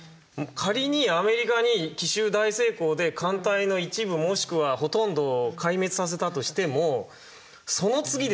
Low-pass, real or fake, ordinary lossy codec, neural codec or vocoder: none; real; none; none